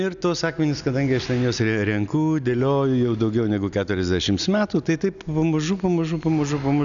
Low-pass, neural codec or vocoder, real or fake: 7.2 kHz; none; real